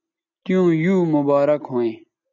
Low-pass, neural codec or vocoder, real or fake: 7.2 kHz; none; real